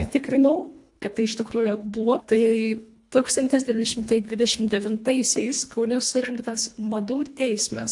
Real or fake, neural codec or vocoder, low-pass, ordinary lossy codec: fake; codec, 24 kHz, 1.5 kbps, HILCodec; 10.8 kHz; AAC, 64 kbps